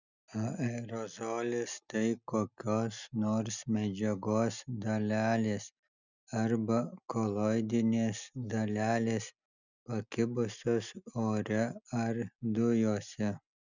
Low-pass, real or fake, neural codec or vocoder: 7.2 kHz; real; none